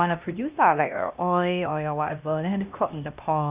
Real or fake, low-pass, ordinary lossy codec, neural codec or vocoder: fake; 3.6 kHz; Opus, 64 kbps; codec, 16 kHz, 1 kbps, X-Codec, HuBERT features, trained on LibriSpeech